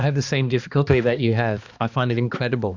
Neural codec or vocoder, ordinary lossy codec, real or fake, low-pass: codec, 16 kHz, 2 kbps, X-Codec, HuBERT features, trained on balanced general audio; Opus, 64 kbps; fake; 7.2 kHz